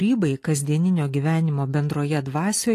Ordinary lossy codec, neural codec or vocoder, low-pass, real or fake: AAC, 48 kbps; none; 14.4 kHz; real